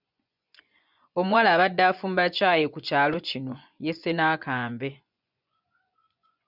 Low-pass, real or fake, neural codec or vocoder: 5.4 kHz; fake; vocoder, 24 kHz, 100 mel bands, Vocos